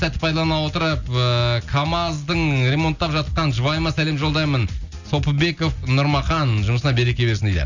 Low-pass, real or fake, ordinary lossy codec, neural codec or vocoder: 7.2 kHz; real; none; none